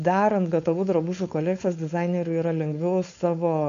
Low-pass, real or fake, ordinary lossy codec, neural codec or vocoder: 7.2 kHz; fake; MP3, 64 kbps; codec, 16 kHz, 4.8 kbps, FACodec